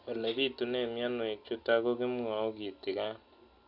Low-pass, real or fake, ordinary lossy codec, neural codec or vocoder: 5.4 kHz; real; AAC, 48 kbps; none